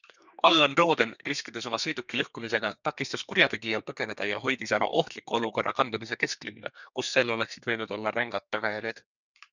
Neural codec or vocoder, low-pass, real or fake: codec, 32 kHz, 1.9 kbps, SNAC; 7.2 kHz; fake